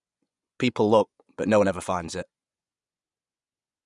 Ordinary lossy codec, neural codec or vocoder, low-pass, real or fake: none; vocoder, 24 kHz, 100 mel bands, Vocos; 10.8 kHz; fake